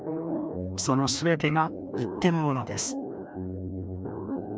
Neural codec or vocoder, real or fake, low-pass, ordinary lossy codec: codec, 16 kHz, 1 kbps, FreqCodec, larger model; fake; none; none